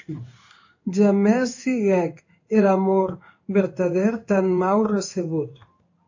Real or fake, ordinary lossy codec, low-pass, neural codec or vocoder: fake; AAC, 48 kbps; 7.2 kHz; codec, 16 kHz in and 24 kHz out, 1 kbps, XY-Tokenizer